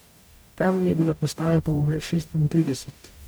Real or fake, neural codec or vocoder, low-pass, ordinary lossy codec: fake; codec, 44.1 kHz, 0.9 kbps, DAC; none; none